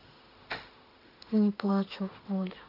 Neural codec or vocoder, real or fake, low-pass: autoencoder, 48 kHz, 32 numbers a frame, DAC-VAE, trained on Japanese speech; fake; 5.4 kHz